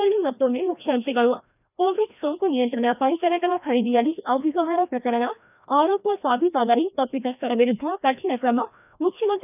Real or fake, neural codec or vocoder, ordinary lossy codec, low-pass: fake; codec, 16 kHz, 1 kbps, FreqCodec, larger model; none; 3.6 kHz